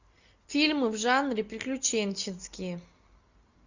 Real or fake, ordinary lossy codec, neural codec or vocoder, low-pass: real; Opus, 64 kbps; none; 7.2 kHz